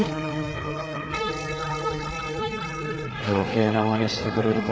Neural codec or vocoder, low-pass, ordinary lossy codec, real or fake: codec, 16 kHz, 4 kbps, FreqCodec, larger model; none; none; fake